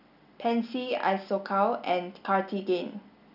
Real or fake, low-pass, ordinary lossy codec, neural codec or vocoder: real; 5.4 kHz; none; none